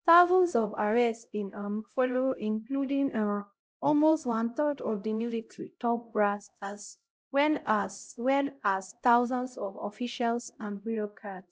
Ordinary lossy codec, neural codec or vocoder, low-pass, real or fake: none; codec, 16 kHz, 0.5 kbps, X-Codec, HuBERT features, trained on LibriSpeech; none; fake